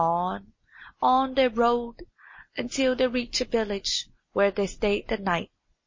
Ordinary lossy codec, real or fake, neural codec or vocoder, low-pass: MP3, 32 kbps; real; none; 7.2 kHz